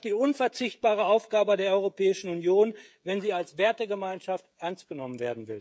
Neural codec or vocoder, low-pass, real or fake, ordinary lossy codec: codec, 16 kHz, 16 kbps, FreqCodec, smaller model; none; fake; none